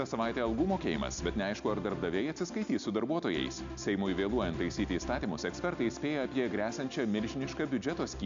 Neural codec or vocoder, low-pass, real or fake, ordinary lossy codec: none; 7.2 kHz; real; MP3, 64 kbps